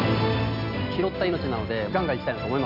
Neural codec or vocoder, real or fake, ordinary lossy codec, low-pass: none; real; none; 5.4 kHz